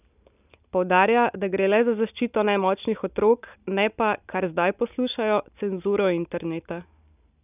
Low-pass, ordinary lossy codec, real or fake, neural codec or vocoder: 3.6 kHz; none; real; none